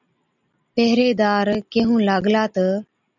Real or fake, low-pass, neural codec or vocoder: real; 7.2 kHz; none